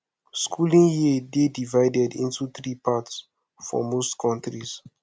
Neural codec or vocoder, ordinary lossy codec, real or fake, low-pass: none; none; real; none